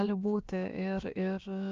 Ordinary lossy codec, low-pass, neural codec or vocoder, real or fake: Opus, 32 kbps; 7.2 kHz; codec, 16 kHz, about 1 kbps, DyCAST, with the encoder's durations; fake